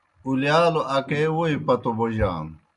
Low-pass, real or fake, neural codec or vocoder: 10.8 kHz; real; none